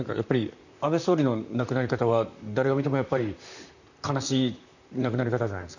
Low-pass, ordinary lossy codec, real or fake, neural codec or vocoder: 7.2 kHz; none; fake; vocoder, 44.1 kHz, 128 mel bands, Pupu-Vocoder